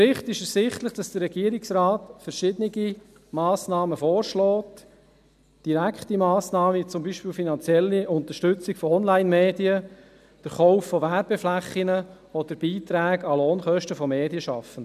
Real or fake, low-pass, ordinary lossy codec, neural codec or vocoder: real; 14.4 kHz; none; none